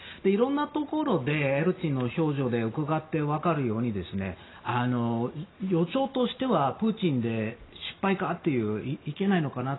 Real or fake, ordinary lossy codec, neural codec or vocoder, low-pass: real; AAC, 16 kbps; none; 7.2 kHz